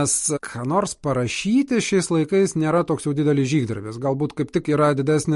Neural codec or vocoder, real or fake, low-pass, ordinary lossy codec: none; real; 14.4 kHz; MP3, 48 kbps